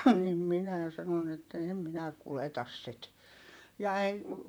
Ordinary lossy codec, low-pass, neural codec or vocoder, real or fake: none; none; vocoder, 44.1 kHz, 128 mel bands, Pupu-Vocoder; fake